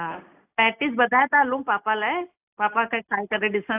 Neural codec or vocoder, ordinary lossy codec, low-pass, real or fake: none; AAC, 32 kbps; 3.6 kHz; real